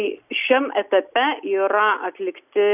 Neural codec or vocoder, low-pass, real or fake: none; 3.6 kHz; real